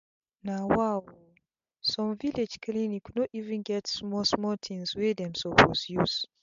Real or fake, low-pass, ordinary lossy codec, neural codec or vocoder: real; 7.2 kHz; MP3, 64 kbps; none